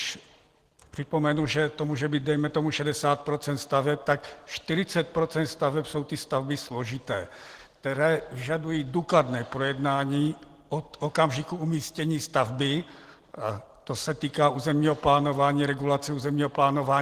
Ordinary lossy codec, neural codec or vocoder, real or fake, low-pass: Opus, 16 kbps; none; real; 14.4 kHz